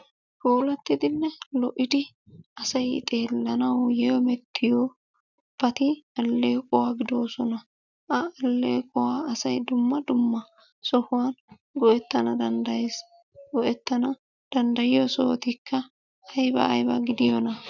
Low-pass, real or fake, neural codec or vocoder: 7.2 kHz; real; none